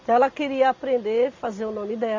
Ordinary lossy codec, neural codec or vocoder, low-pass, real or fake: MP3, 48 kbps; none; 7.2 kHz; real